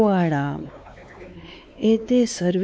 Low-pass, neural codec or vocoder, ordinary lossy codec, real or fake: none; codec, 16 kHz, 4 kbps, X-Codec, WavLM features, trained on Multilingual LibriSpeech; none; fake